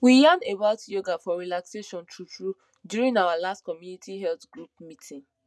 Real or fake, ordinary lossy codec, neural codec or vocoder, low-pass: real; none; none; none